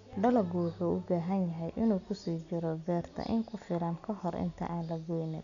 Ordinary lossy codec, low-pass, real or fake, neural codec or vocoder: none; 7.2 kHz; real; none